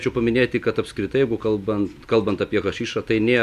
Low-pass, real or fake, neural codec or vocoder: 14.4 kHz; real; none